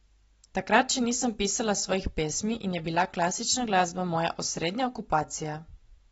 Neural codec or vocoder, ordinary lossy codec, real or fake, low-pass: none; AAC, 24 kbps; real; 19.8 kHz